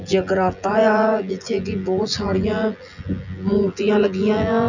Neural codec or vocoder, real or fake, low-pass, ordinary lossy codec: vocoder, 24 kHz, 100 mel bands, Vocos; fake; 7.2 kHz; none